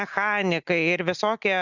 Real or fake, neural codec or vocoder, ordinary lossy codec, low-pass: real; none; Opus, 64 kbps; 7.2 kHz